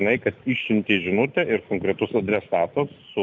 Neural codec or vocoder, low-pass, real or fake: none; 7.2 kHz; real